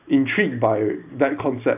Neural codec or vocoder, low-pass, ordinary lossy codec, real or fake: none; 3.6 kHz; none; real